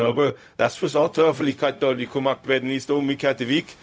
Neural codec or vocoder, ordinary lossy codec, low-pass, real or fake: codec, 16 kHz, 0.4 kbps, LongCat-Audio-Codec; none; none; fake